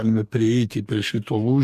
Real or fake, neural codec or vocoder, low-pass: fake; codec, 44.1 kHz, 2.6 kbps, DAC; 14.4 kHz